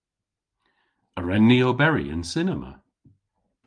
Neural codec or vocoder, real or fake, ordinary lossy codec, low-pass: none; real; Opus, 32 kbps; 9.9 kHz